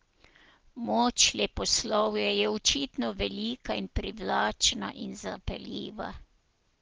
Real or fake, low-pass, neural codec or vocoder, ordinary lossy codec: real; 7.2 kHz; none; Opus, 16 kbps